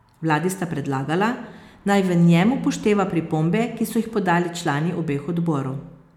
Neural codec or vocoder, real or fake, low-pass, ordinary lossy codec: none; real; 19.8 kHz; none